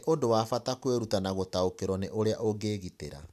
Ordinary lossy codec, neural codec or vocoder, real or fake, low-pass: none; none; real; 14.4 kHz